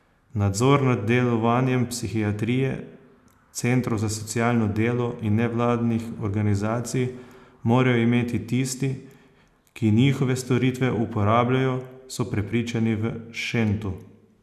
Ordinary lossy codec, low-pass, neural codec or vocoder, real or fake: none; 14.4 kHz; none; real